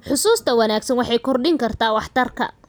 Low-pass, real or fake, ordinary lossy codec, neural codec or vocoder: none; real; none; none